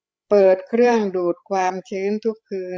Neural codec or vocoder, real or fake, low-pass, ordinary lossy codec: codec, 16 kHz, 16 kbps, FreqCodec, larger model; fake; none; none